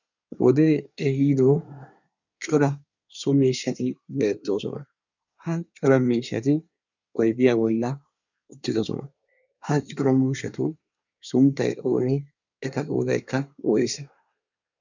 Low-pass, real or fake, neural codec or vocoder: 7.2 kHz; fake; codec, 24 kHz, 1 kbps, SNAC